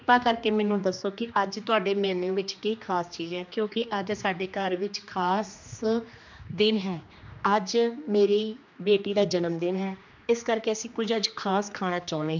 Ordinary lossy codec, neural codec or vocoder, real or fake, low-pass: MP3, 64 kbps; codec, 16 kHz, 2 kbps, X-Codec, HuBERT features, trained on general audio; fake; 7.2 kHz